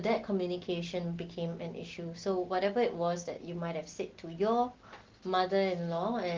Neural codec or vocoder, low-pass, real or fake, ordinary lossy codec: none; 7.2 kHz; real; Opus, 16 kbps